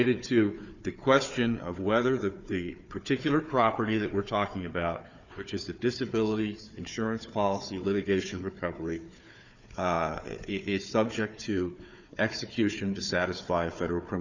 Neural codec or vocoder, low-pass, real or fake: codec, 16 kHz, 4 kbps, FunCodec, trained on Chinese and English, 50 frames a second; 7.2 kHz; fake